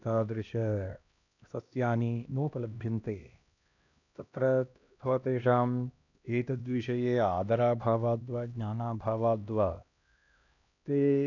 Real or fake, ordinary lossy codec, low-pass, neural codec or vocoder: fake; none; 7.2 kHz; codec, 16 kHz, 1 kbps, X-Codec, HuBERT features, trained on LibriSpeech